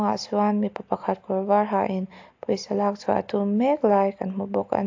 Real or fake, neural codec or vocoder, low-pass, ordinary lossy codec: real; none; 7.2 kHz; AAC, 48 kbps